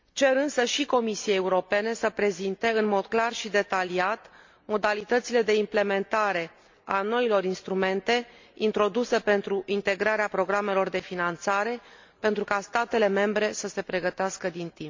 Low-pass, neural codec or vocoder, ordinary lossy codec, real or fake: 7.2 kHz; none; MP3, 48 kbps; real